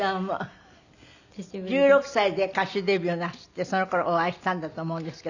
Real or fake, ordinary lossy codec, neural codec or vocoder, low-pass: real; none; none; 7.2 kHz